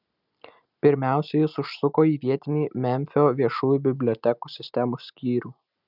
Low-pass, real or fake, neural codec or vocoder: 5.4 kHz; real; none